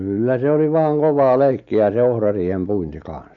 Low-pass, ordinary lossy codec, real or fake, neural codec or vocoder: 7.2 kHz; none; real; none